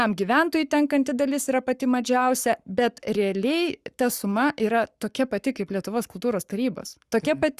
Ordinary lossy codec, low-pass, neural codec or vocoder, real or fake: Opus, 64 kbps; 14.4 kHz; codec, 44.1 kHz, 7.8 kbps, Pupu-Codec; fake